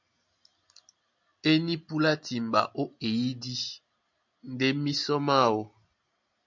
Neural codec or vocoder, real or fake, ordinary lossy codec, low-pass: none; real; MP3, 64 kbps; 7.2 kHz